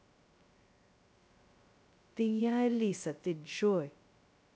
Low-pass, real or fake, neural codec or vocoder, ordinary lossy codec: none; fake; codec, 16 kHz, 0.2 kbps, FocalCodec; none